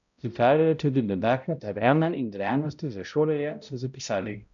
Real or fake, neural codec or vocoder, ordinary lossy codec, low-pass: fake; codec, 16 kHz, 0.5 kbps, X-Codec, HuBERT features, trained on balanced general audio; none; 7.2 kHz